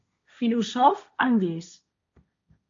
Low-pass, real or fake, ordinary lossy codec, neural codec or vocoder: 7.2 kHz; fake; MP3, 48 kbps; codec, 16 kHz, 1.1 kbps, Voila-Tokenizer